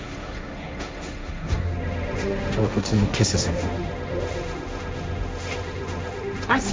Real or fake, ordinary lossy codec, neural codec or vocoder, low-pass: fake; none; codec, 16 kHz, 1.1 kbps, Voila-Tokenizer; none